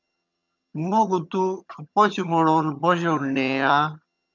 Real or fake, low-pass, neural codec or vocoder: fake; 7.2 kHz; vocoder, 22.05 kHz, 80 mel bands, HiFi-GAN